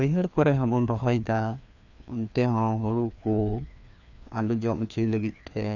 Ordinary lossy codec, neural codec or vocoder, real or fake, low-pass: none; codec, 16 kHz, 2 kbps, FreqCodec, larger model; fake; 7.2 kHz